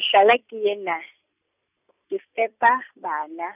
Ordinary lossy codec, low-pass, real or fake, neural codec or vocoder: none; 3.6 kHz; real; none